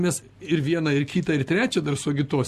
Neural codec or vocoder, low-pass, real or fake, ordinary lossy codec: none; 14.4 kHz; real; Opus, 64 kbps